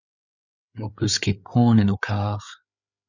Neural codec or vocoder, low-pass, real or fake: codec, 16 kHz, 8 kbps, FreqCodec, larger model; 7.2 kHz; fake